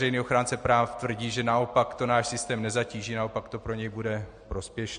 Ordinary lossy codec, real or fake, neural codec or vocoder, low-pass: MP3, 48 kbps; real; none; 9.9 kHz